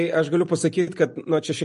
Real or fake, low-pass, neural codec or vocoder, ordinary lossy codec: real; 10.8 kHz; none; MP3, 48 kbps